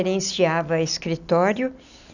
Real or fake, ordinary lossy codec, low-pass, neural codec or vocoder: real; none; 7.2 kHz; none